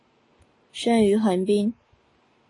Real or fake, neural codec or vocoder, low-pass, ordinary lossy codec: real; none; 10.8 kHz; AAC, 32 kbps